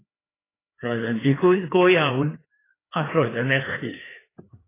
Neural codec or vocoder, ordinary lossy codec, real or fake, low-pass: codec, 16 kHz, 2 kbps, FreqCodec, larger model; AAC, 16 kbps; fake; 3.6 kHz